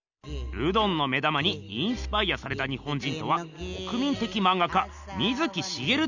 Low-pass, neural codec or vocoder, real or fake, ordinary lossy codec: 7.2 kHz; none; real; none